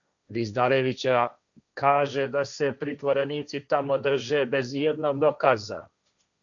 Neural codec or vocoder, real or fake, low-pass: codec, 16 kHz, 1.1 kbps, Voila-Tokenizer; fake; 7.2 kHz